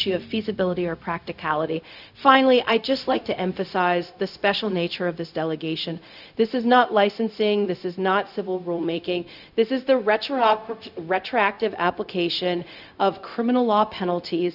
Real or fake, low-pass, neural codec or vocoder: fake; 5.4 kHz; codec, 16 kHz, 0.4 kbps, LongCat-Audio-Codec